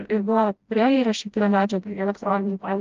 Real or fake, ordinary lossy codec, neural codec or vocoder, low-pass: fake; Opus, 32 kbps; codec, 16 kHz, 0.5 kbps, FreqCodec, smaller model; 7.2 kHz